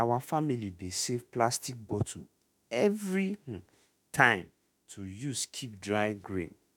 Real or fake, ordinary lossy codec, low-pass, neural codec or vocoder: fake; none; 19.8 kHz; autoencoder, 48 kHz, 32 numbers a frame, DAC-VAE, trained on Japanese speech